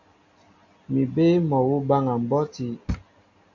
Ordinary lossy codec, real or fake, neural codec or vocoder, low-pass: MP3, 64 kbps; real; none; 7.2 kHz